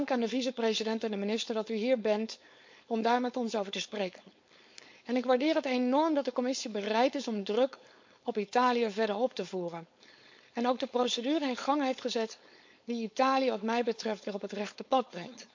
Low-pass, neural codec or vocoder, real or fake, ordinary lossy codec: 7.2 kHz; codec, 16 kHz, 4.8 kbps, FACodec; fake; MP3, 48 kbps